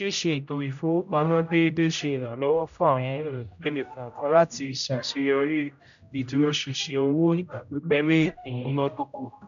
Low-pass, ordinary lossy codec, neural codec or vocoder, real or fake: 7.2 kHz; none; codec, 16 kHz, 0.5 kbps, X-Codec, HuBERT features, trained on general audio; fake